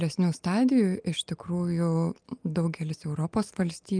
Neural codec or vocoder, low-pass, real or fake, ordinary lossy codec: none; 9.9 kHz; real; Opus, 32 kbps